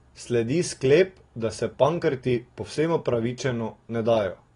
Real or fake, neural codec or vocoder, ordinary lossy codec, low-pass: real; none; AAC, 32 kbps; 10.8 kHz